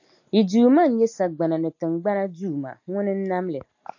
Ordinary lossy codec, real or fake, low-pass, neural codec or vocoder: AAC, 48 kbps; real; 7.2 kHz; none